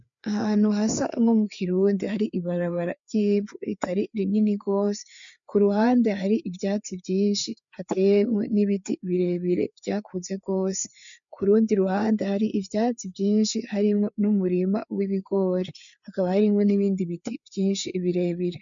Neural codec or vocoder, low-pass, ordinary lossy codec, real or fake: codec, 16 kHz, 4 kbps, FreqCodec, larger model; 7.2 kHz; MP3, 64 kbps; fake